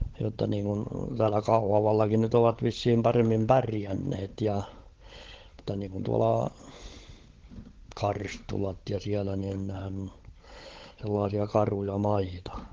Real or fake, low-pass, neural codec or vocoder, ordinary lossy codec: fake; 7.2 kHz; codec, 16 kHz, 16 kbps, FunCodec, trained on LibriTTS, 50 frames a second; Opus, 16 kbps